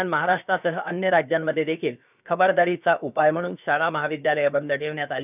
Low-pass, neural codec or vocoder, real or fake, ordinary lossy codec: 3.6 kHz; codec, 16 kHz, about 1 kbps, DyCAST, with the encoder's durations; fake; none